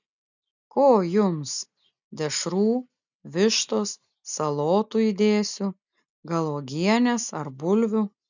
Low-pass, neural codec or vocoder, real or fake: 7.2 kHz; none; real